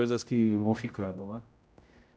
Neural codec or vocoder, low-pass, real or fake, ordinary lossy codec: codec, 16 kHz, 0.5 kbps, X-Codec, HuBERT features, trained on general audio; none; fake; none